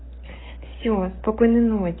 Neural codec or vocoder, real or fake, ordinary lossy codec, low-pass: codec, 44.1 kHz, 7.8 kbps, DAC; fake; AAC, 16 kbps; 7.2 kHz